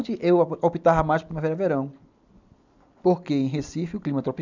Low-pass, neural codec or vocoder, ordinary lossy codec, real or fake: 7.2 kHz; none; none; real